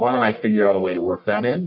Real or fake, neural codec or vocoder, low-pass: fake; codec, 44.1 kHz, 1.7 kbps, Pupu-Codec; 5.4 kHz